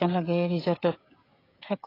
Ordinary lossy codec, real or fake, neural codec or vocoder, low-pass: AAC, 24 kbps; fake; codec, 16 kHz, 16 kbps, FreqCodec, smaller model; 5.4 kHz